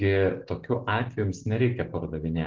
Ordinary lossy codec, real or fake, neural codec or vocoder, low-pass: Opus, 16 kbps; real; none; 7.2 kHz